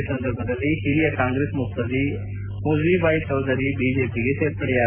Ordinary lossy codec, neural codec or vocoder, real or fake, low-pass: MP3, 24 kbps; none; real; 3.6 kHz